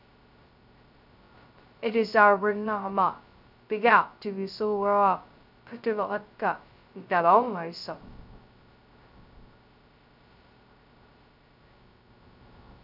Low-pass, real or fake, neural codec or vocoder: 5.4 kHz; fake; codec, 16 kHz, 0.2 kbps, FocalCodec